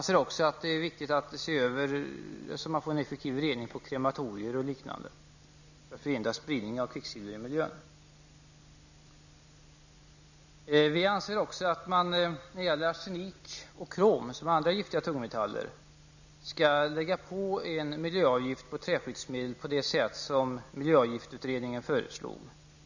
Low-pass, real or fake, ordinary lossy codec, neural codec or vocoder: 7.2 kHz; real; none; none